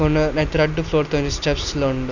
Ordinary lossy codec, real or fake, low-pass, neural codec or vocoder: none; real; 7.2 kHz; none